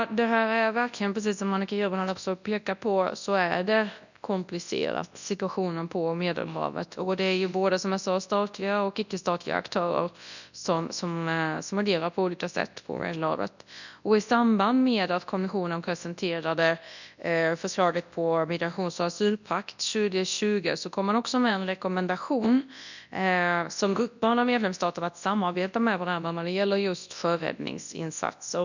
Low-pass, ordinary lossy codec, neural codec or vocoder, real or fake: 7.2 kHz; none; codec, 24 kHz, 0.9 kbps, WavTokenizer, large speech release; fake